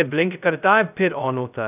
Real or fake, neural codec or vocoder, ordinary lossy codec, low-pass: fake; codec, 16 kHz, 0.2 kbps, FocalCodec; none; 3.6 kHz